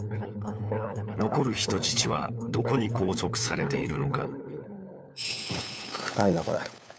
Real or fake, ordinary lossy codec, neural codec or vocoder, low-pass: fake; none; codec, 16 kHz, 4 kbps, FunCodec, trained on LibriTTS, 50 frames a second; none